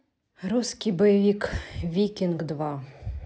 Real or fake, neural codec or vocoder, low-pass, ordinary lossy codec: real; none; none; none